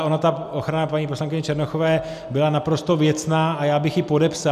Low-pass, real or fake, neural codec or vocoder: 14.4 kHz; real; none